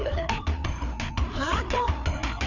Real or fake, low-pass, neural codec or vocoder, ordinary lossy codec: fake; 7.2 kHz; codec, 16 kHz, 4 kbps, FreqCodec, larger model; none